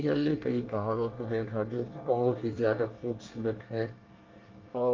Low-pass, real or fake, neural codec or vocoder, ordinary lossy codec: 7.2 kHz; fake; codec, 24 kHz, 1 kbps, SNAC; Opus, 24 kbps